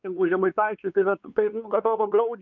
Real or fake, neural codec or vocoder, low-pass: fake; codec, 16 kHz, 4 kbps, X-Codec, WavLM features, trained on Multilingual LibriSpeech; 7.2 kHz